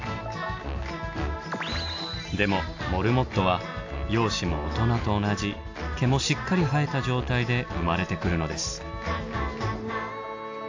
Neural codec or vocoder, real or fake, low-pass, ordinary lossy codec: none; real; 7.2 kHz; AAC, 48 kbps